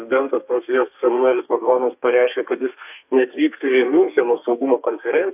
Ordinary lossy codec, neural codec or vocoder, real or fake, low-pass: AAC, 32 kbps; codec, 32 kHz, 1.9 kbps, SNAC; fake; 3.6 kHz